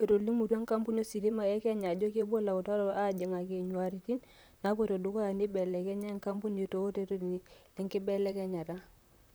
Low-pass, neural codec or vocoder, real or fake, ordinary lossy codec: none; vocoder, 44.1 kHz, 128 mel bands, Pupu-Vocoder; fake; none